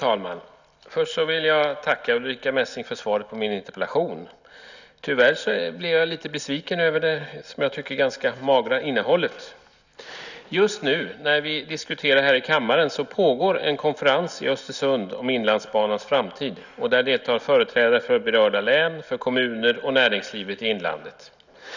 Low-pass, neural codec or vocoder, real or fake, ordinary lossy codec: 7.2 kHz; none; real; none